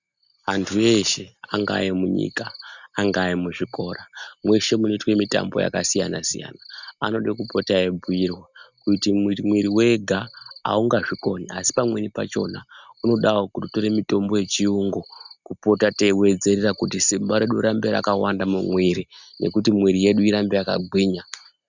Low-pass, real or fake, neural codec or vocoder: 7.2 kHz; real; none